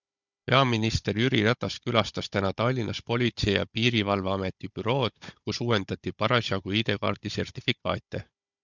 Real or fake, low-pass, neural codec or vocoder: fake; 7.2 kHz; codec, 16 kHz, 16 kbps, FunCodec, trained on Chinese and English, 50 frames a second